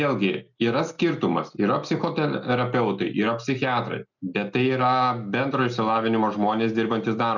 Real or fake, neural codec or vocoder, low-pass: real; none; 7.2 kHz